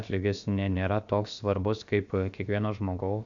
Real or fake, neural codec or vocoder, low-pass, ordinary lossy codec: fake; codec, 16 kHz, about 1 kbps, DyCAST, with the encoder's durations; 7.2 kHz; Opus, 64 kbps